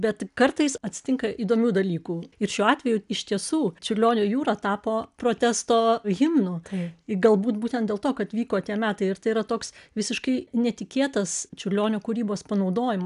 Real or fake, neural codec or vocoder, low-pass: real; none; 10.8 kHz